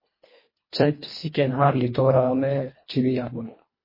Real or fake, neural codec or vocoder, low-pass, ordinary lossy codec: fake; codec, 24 kHz, 1.5 kbps, HILCodec; 5.4 kHz; MP3, 24 kbps